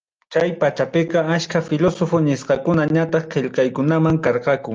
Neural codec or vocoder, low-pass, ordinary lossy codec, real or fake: none; 7.2 kHz; Opus, 24 kbps; real